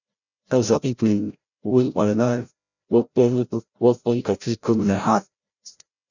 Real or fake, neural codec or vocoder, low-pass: fake; codec, 16 kHz, 0.5 kbps, FreqCodec, larger model; 7.2 kHz